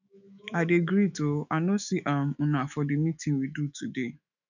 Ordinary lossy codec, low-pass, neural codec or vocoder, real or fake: none; 7.2 kHz; autoencoder, 48 kHz, 128 numbers a frame, DAC-VAE, trained on Japanese speech; fake